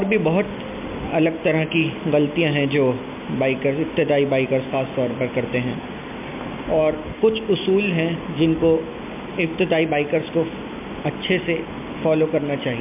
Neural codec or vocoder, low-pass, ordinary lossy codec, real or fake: none; 3.6 kHz; MP3, 32 kbps; real